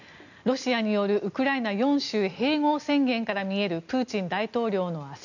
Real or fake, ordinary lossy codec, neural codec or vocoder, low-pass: real; none; none; 7.2 kHz